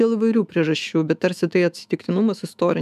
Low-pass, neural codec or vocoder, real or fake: 14.4 kHz; autoencoder, 48 kHz, 128 numbers a frame, DAC-VAE, trained on Japanese speech; fake